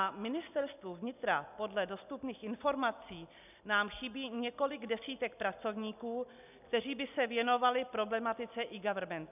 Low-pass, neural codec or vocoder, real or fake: 3.6 kHz; none; real